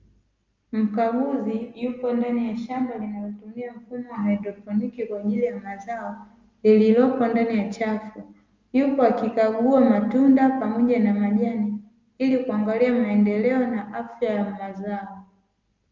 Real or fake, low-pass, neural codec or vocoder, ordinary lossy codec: real; 7.2 kHz; none; Opus, 24 kbps